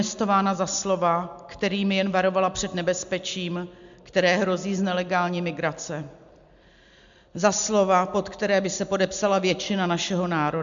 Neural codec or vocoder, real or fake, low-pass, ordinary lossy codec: none; real; 7.2 kHz; MP3, 64 kbps